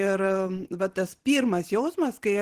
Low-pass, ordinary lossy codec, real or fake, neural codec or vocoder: 14.4 kHz; Opus, 16 kbps; fake; vocoder, 44.1 kHz, 128 mel bands every 512 samples, BigVGAN v2